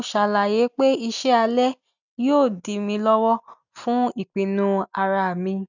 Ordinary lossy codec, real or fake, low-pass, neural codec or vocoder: none; real; 7.2 kHz; none